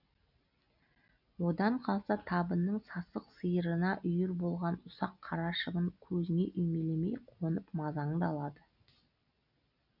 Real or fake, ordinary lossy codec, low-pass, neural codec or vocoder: real; none; 5.4 kHz; none